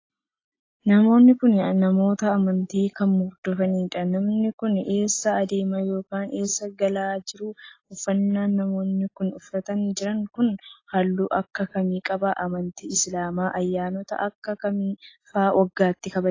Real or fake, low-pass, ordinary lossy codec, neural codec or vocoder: real; 7.2 kHz; AAC, 32 kbps; none